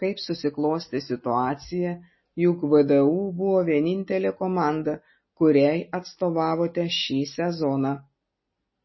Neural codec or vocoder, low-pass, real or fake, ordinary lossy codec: none; 7.2 kHz; real; MP3, 24 kbps